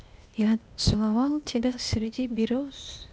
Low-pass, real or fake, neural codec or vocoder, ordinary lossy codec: none; fake; codec, 16 kHz, 0.8 kbps, ZipCodec; none